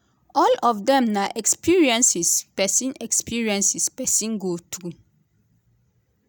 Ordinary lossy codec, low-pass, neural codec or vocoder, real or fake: none; none; none; real